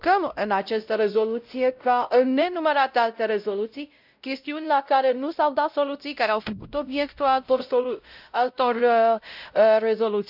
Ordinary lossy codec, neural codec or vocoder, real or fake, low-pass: none; codec, 16 kHz, 0.5 kbps, X-Codec, WavLM features, trained on Multilingual LibriSpeech; fake; 5.4 kHz